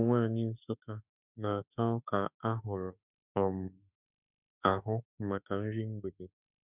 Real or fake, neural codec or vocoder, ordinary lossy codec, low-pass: fake; autoencoder, 48 kHz, 32 numbers a frame, DAC-VAE, trained on Japanese speech; none; 3.6 kHz